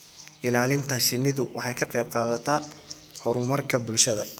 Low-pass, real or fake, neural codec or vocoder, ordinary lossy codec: none; fake; codec, 44.1 kHz, 2.6 kbps, SNAC; none